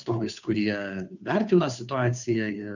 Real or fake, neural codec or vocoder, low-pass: fake; codec, 16 kHz, 2 kbps, FunCodec, trained on Chinese and English, 25 frames a second; 7.2 kHz